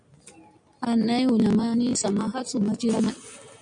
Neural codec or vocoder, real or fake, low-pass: none; real; 9.9 kHz